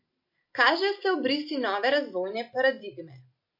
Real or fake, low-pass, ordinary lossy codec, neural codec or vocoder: real; 5.4 kHz; MP3, 32 kbps; none